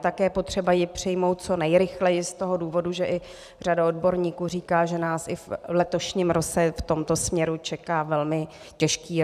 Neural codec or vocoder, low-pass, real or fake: vocoder, 44.1 kHz, 128 mel bands every 512 samples, BigVGAN v2; 14.4 kHz; fake